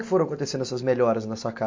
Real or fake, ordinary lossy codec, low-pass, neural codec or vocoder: real; MP3, 48 kbps; 7.2 kHz; none